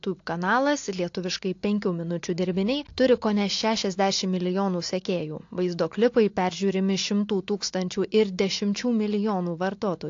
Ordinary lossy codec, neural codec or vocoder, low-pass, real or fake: AAC, 48 kbps; none; 7.2 kHz; real